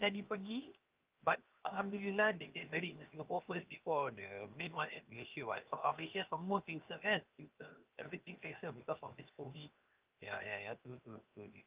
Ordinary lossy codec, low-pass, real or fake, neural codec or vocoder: Opus, 32 kbps; 3.6 kHz; fake; codec, 16 kHz, 0.7 kbps, FocalCodec